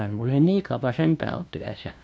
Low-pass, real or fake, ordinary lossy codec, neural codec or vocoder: none; fake; none; codec, 16 kHz, 0.5 kbps, FunCodec, trained on LibriTTS, 25 frames a second